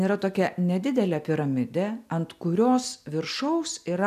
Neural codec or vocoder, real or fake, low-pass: none; real; 14.4 kHz